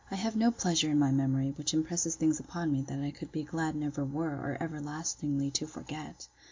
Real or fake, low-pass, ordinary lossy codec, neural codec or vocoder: real; 7.2 kHz; MP3, 48 kbps; none